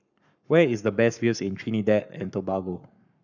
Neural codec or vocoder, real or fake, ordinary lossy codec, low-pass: codec, 44.1 kHz, 7.8 kbps, Pupu-Codec; fake; none; 7.2 kHz